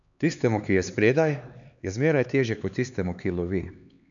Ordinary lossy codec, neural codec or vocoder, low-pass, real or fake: none; codec, 16 kHz, 4 kbps, X-Codec, HuBERT features, trained on LibriSpeech; 7.2 kHz; fake